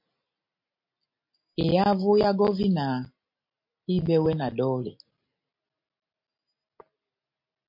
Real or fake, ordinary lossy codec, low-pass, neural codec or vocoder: real; MP3, 32 kbps; 5.4 kHz; none